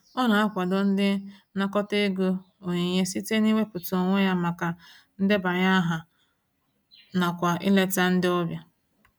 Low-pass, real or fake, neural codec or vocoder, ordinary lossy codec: none; real; none; none